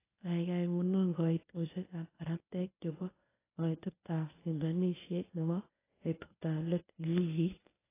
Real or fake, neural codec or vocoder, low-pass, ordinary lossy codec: fake; codec, 24 kHz, 0.9 kbps, WavTokenizer, medium speech release version 1; 3.6 kHz; AAC, 16 kbps